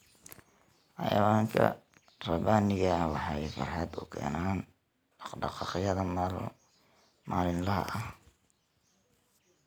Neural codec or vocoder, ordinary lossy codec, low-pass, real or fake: none; none; none; real